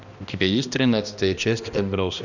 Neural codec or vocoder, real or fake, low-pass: codec, 16 kHz, 1 kbps, X-Codec, HuBERT features, trained on balanced general audio; fake; 7.2 kHz